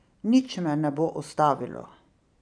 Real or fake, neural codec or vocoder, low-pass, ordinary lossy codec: fake; vocoder, 44.1 kHz, 128 mel bands every 512 samples, BigVGAN v2; 9.9 kHz; none